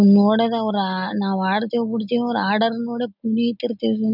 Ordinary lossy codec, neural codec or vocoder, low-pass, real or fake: none; none; 5.4 kHz; real